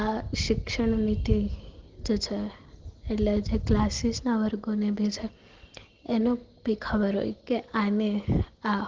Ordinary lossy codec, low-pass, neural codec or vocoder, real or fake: Opus, 16 kbps; 7.2 kHz; none; real